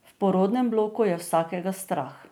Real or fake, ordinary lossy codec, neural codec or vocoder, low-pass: real; none; none; none